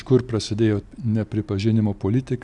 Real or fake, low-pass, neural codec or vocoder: real; 10.8 kHz; none